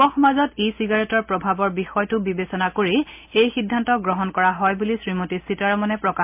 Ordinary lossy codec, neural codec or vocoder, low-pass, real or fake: MP3, 32 kbps; none; 3.6 kHz; real